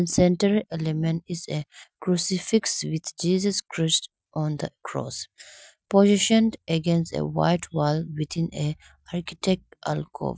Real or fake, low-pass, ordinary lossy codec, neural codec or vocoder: real; none; none; none